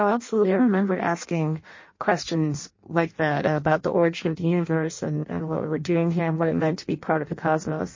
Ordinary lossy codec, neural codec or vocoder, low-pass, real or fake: MP3, 32 kbps; codec, 16 kHz in and 24 kHz out, 0.6 kbps, FireRedTTS-2 codec; 7.2 kHz; fake